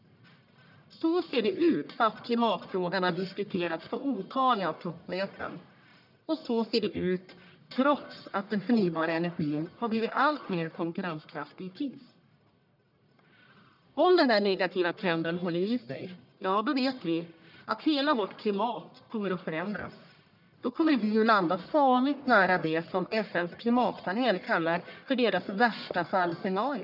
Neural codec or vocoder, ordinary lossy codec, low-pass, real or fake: codec, 44.1 kHz, 1.7 kbps, Pupu-Codec; none; 5.4 kHz; fake